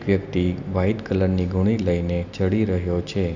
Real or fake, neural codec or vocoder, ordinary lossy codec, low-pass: real; none; none; 7.2 kHz